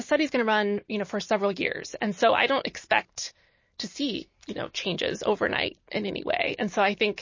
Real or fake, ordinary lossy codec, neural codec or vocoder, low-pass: real; MP3, 32 kbps; none; 7.2 kHz